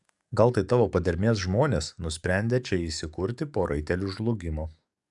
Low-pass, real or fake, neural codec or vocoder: 10.8 kHz; fake; codec, 44.1 kHz, 7.8 kbps, DAC